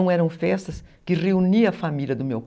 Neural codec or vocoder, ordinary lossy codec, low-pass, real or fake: none; none; none; real